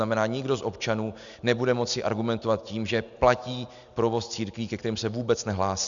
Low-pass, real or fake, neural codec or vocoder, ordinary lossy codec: 7.2 kHz; real; none; MP3, 96 kbps